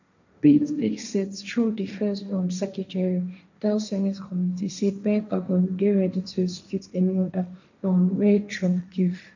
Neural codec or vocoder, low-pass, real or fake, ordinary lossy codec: codec, 16 kHz, 1.1 kbps, Voila-Tokenizer; 7.2 kHz; fake; none